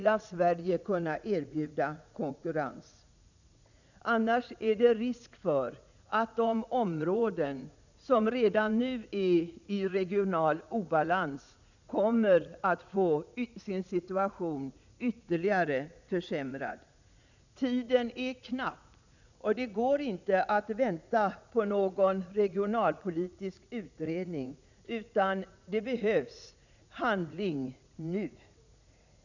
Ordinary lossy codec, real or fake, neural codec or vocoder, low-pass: none; fake; vocoder, 44.1 kHz, 80 mel bands, Vocos; 7.2 kHz